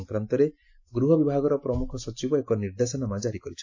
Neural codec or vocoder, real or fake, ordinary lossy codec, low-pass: none; real; AAC, 48 kbps; 7.2 kHz